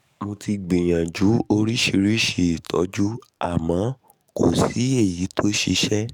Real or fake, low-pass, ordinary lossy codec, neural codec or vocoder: fake; 19.8 kHz; none; codec, 44.1 kHz, 7.8 kbps, DAC